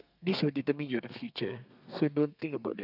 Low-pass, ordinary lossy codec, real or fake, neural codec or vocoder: 5.4 kHz; none; fake; codec, 32 kHz, 1.9 kbps, SNAC